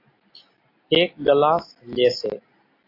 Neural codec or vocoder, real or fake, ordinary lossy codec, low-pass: none; real; AAC, 32 kbps; 5.4 kHz